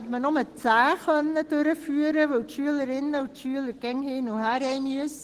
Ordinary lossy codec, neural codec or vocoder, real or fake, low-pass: Opus, 16 kbps; none; real; 14.4 kHz